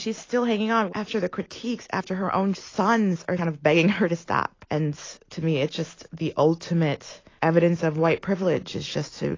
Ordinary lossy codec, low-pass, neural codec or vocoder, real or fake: AAC, 32 kbps; 7.2 kHz; none; real